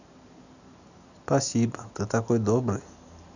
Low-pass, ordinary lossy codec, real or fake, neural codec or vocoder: 7.2 kHz; none; real; none